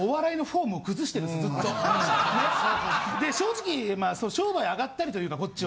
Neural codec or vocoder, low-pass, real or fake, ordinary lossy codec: none; none; real; none